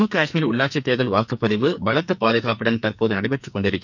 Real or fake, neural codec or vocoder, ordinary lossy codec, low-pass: fake; codec, 44.1 kHz, 2.6 kbps, SNAC; none; 7.2 kHz